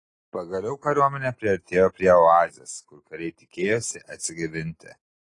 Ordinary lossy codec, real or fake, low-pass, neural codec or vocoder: AAC, 32 kbps; real; 10.8 kHz; none